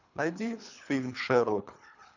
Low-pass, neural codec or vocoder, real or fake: 7.2 kHz; codec, 24 kHz, 3 kbps, HILCodec; fake